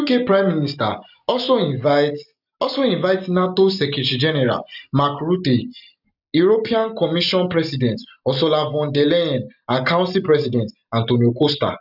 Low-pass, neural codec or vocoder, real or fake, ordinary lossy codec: 5.4 kHz; none; real; none